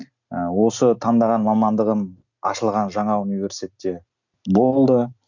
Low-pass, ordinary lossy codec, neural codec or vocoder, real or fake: 7.2 kHz; none; none; real